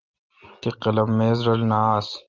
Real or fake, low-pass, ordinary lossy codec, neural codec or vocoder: real; 7.2 kHz; Opus, 32 kbps; none